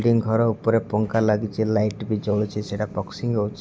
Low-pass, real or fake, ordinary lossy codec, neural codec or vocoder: none; real; none; none